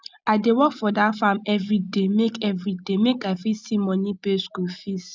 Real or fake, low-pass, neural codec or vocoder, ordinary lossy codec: real; none; none; none